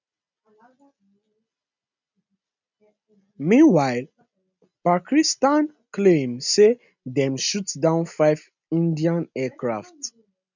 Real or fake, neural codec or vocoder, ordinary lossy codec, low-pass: real; none; none; 7.2 kHz